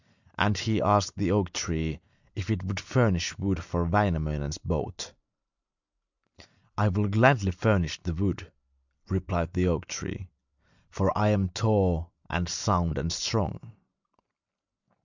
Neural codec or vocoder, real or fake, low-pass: none; real; 7.2 kHz